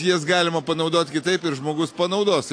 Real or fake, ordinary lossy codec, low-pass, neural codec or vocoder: real; AAC, 48 kbps; 9.9 kHz; none